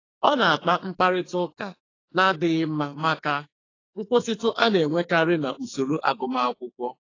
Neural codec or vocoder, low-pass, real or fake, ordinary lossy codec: codec, 44.1 kHz, 2.6 kbps, SNAC; 7.2 kHz; fake; AAC, 32 kbps